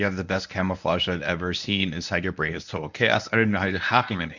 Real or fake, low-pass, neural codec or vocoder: fake; 7.2 kHz; codec, 24 kHz, 0.9 kbps, WavTokenizer, medium speech release version 1